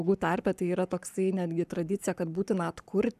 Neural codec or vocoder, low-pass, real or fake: codec, 44.1 kHz, 7.8 kbps, Pupu-Codec; 14.4 kHz; fake